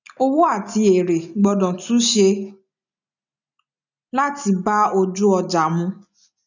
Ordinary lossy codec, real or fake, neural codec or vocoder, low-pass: none; real; none; 7.2 kHz